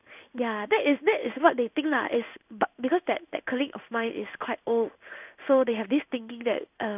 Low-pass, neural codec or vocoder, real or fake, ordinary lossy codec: 3.6 kHz; codec, 16 kHz in and 24 kHz out, 1 kbps, XY-Tokenizer; fake; none